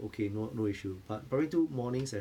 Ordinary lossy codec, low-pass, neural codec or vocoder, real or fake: none; 19.8 kHz; none; real